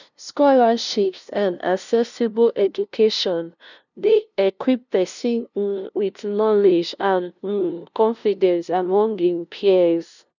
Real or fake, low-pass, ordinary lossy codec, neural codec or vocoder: fake; 7.2 kHz; none; codec, 16 kHz, 0.5 kbps, FunCodec, trained on LibriTTS, 25 frames a second